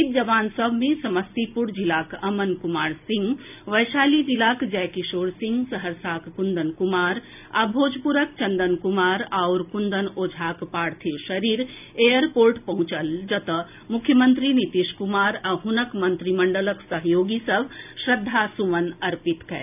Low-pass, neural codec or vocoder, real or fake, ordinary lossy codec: 3.6 kHz; none; real; none